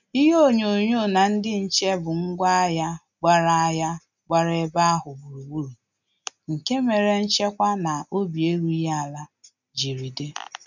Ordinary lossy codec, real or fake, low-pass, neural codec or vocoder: none; real; 7.2 kHz; none